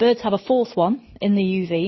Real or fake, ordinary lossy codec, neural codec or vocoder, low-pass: real; MP3, 24 kbps; none; 7.2 kHz